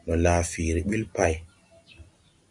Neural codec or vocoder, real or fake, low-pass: none; real; 10.8 kHz